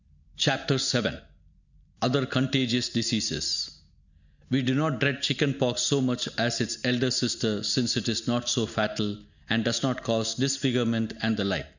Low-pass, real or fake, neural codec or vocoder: 7.2 kHz; real; none